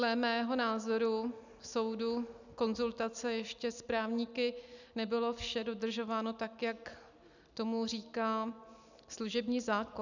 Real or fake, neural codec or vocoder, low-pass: real; none; 7.2 kHz